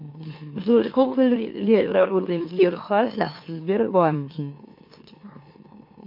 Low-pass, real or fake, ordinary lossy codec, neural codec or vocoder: 5.4 kHz; fake; MP3, 32 kbps; autoencoder, 44.1 kHz, a latent of 192 numbers a frame, MeloTTS